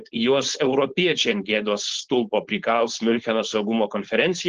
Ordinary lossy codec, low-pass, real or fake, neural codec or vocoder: Opus, 16 kbps; 7.2 kHz; fake; codec, 16 kHz, 4.8 kbps, FACodec